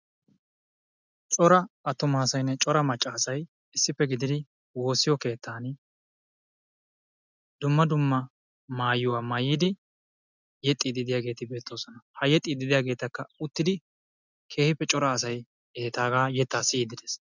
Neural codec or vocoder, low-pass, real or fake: none; 7.2 kHz; real